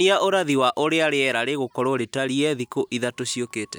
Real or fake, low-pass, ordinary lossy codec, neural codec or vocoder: real; none; none; none